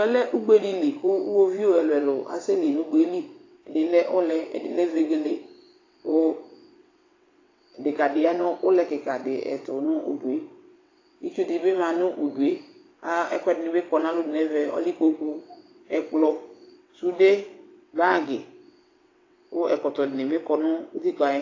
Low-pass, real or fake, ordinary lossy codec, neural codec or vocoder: 7.2 kHz; fake; AAC, 32 kbps; vocoder, 22.05 kHz, 80 mel bands, WaveNeXt